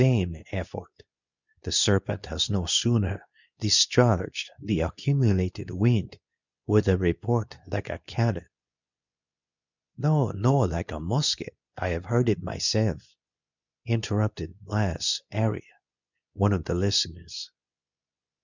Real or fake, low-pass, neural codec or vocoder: fake; 7.2 kHz; codec, 24 kHz, 0.9 kbps, WavTokenizer, medium speech release version 2